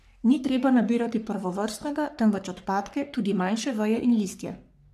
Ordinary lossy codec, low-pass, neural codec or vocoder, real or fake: none; 14.4 kHz; codec, 44.1 kHz, 3.4 kbps, Pupu-Codec; fake